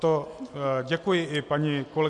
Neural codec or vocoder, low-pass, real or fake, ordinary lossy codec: none; 10.8 kHz; real; Opus, 64 kbps